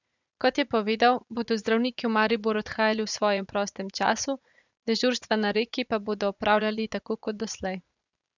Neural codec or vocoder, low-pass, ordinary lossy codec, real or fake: vocoder, 44.1 kHz, 80 mel bands, Vocos; 7.2 kHz; none; fake